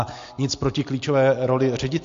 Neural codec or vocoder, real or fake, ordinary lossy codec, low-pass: none; real; AAC, 48 kbps; 7.2 kHz